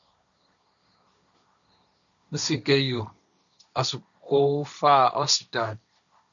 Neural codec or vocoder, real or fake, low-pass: codec, 16 kHz, 1.1 kbps, Voila-Tokenizer; fake; 7.2 kHz